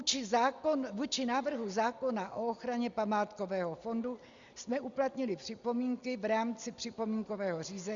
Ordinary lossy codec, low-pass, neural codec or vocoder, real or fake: Opus, 64 kbps; 7.2 kHz; none; real